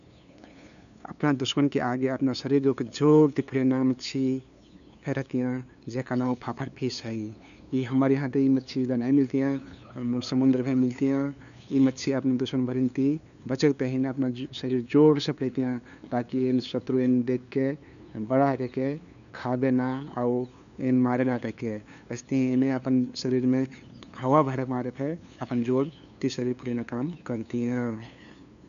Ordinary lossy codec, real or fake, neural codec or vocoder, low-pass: none; fake; codec, 16 kHz, 2 kbps, FunCodec, trained on LibriTTS, 25 frames a second; 7.2 kHz